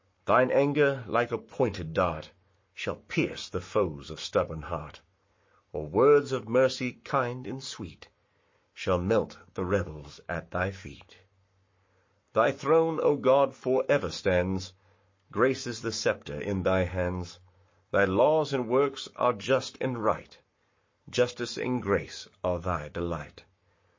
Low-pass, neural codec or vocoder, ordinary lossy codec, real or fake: 7.2 kHz; codec, 44.1 kHz, 7.8 kbps, Pupu-Codec; MP3, 32 kbps; fake